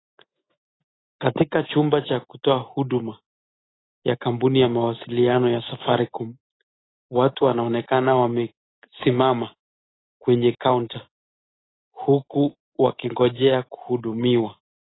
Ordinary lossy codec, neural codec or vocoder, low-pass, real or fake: AAC, 16 kbps; none; 7.2 kHz; real